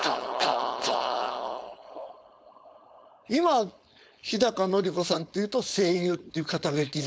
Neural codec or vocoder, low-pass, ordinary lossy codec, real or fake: codec, 16 kHz, 4.8 kbps, FACodec; none; none; fake